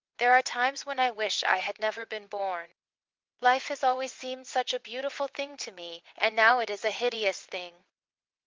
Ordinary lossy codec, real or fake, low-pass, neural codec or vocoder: Opus, 32 kbps; fake; 7.2 kHz; vocoder, 22.05 kHz, 80 mel bands, WaveNeXt